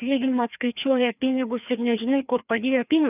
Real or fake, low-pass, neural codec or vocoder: fake; 3.6 kHz; codec, 16 kHz, 2 kbps, FreqCodec, larger model